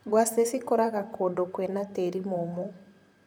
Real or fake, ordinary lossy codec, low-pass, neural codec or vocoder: fake; none; none; vocoder, 44.1 kHz, 128 mel bands, Pupu-Vocoder